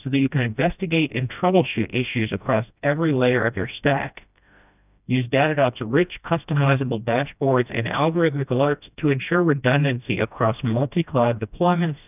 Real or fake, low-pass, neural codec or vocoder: fake; 3.6 kHz; codec, 16 kHz, 1 kbps, FreqCodec, smaller model